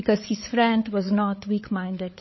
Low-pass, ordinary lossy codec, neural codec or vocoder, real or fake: 7.2 kHz; MP3, 24 kbps; codec, 16 kHz, 8 kbps, FreqCodec, larger model; fake